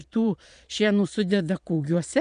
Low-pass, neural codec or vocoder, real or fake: 9.9 kHz; vocoder, 22.05 kHz, 80 mel bands, Vocos; fake